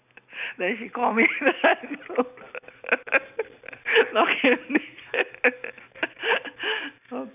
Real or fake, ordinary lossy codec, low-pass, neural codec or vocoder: real; none; 3.6 kHz; none